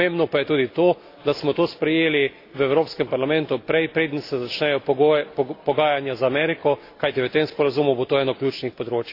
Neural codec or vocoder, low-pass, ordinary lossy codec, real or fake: none; 5.4 kHz; AAC, 32 kbps; real